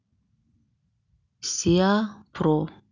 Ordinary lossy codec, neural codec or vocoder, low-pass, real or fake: none; none; 7.2 kHz; real